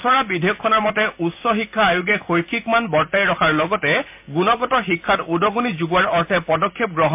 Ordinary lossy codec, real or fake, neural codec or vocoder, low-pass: MP3, 32 kbps; real; none; 3.6 kHz